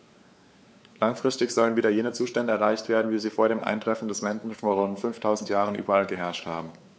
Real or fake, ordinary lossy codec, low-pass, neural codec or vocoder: fake; none; none; codec, 16 kHz, 4 kbps, X-Codec, WavLM features, trained on Multilingual LibriSpeech